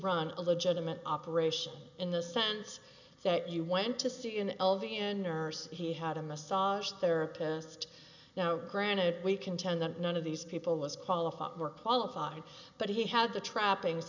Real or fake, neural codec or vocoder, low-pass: real; none; 7.2 kHz